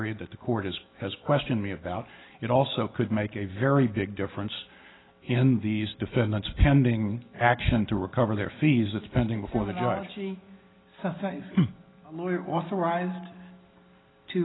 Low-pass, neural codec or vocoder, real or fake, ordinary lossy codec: 7.2 kHz; none; real; AAC, 16 kbps